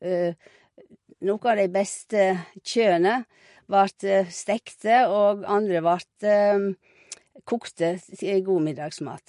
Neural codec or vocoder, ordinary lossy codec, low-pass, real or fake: none; MP3, 48 kbps; 10.8 kHz; real